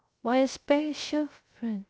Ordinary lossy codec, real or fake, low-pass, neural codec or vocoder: none; fake; none; codec, 16 kHz, 0.3 kbps, FocalCodec